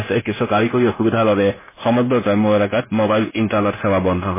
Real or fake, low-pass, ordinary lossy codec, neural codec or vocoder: fake; 3.6 kHz; MP3, 16 kbps; codec, 16 kHz, 0.9 kbps, LongCat-Audio-Codec